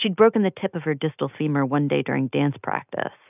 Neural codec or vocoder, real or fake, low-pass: none; real; 3.6 kHz